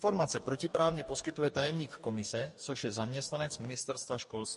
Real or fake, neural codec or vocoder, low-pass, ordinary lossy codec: fake; codec, 44.1 kHz, 2.6 kbps, DAC; 14.4 kHz; MP3, 48 kbps